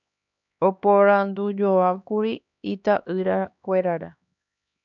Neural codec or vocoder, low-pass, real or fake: codec, 16 kHz, 2 kbps, X-Codec, HuBERT features, trained on LibriSpeech; 7.2 kHz; fake